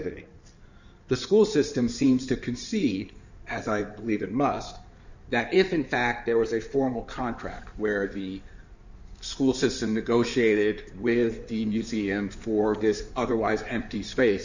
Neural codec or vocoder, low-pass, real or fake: codec, 16 kHz in and 24 kHz out, 2.2 kbps, FireRedTTS-2 codec; 7.2 kHz; fake